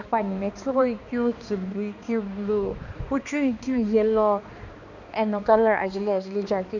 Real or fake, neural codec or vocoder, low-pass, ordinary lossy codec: fake; codec, 16 kHz, 2 kbps, X-Codec, HuBERT features, trained on balanced general audio; 7.2 kHz; none